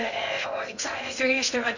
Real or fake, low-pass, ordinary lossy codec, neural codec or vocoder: fake; 7.2 kHz; none; codec, 16 kHz in and 24 kHz out, 0.6 kbps, FocalCodec, streaming, 2048 codes